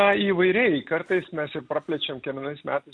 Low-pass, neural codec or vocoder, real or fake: 9.9 kHz; none; real